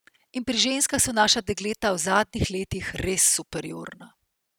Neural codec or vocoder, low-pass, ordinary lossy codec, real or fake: none; none; none; real